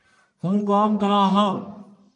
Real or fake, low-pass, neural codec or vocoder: fake; 10.8 kHz; codec, 44.1 kHz, 1.7 kbps, Pupu-Codec